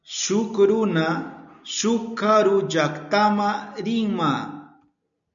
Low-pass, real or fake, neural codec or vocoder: 7.2 kHz; real; none